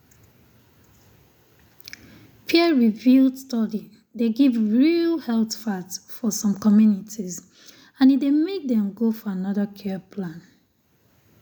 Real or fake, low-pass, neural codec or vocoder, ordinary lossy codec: real; 19.8 kHz; none; none